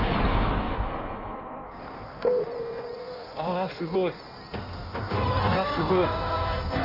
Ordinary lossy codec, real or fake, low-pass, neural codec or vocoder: none; fake; 5.4 kHz; codec, 16 kHz in and 24 kHz out, 1.1 kbps, FireRedTTS-2 codec